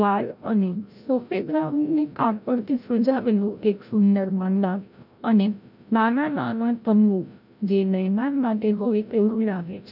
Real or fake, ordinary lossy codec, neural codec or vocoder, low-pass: fake; none; codec, 16 kHz, 0.5 kbps, FreqCodec, larger model; 5.4 kHz